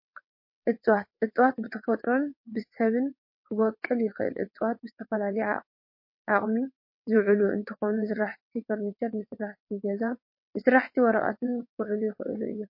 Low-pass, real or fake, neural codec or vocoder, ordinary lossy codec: 5.4 kHz; fake; vocoder, 22.05 kHz, 80 mel bands, WaveNeXt; MP3, 32 kbps